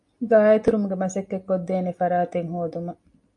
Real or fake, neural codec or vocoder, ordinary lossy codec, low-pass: real; none; MP3, 48 kbps; 10.8 kHz